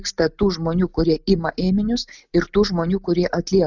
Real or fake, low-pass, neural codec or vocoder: real; 7.2 kHz; none